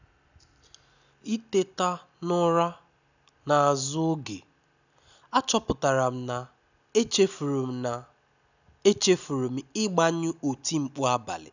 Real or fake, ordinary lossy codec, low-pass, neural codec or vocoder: real; none; 7.2 kHz; none